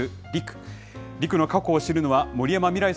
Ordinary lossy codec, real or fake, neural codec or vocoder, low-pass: none; real; none; none